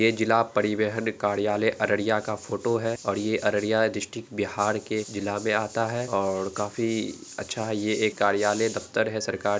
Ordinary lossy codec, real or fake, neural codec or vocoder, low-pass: none; real; none; none